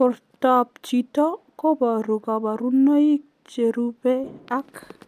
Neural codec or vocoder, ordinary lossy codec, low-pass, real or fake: none; none; 14.4 kHz; real